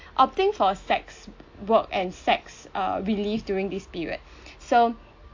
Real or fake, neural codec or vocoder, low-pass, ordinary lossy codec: real; none; 7.2 kHz; AAC, 48 kbps